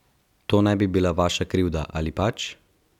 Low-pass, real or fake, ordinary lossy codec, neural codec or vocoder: 19.8 kHz; real; none; none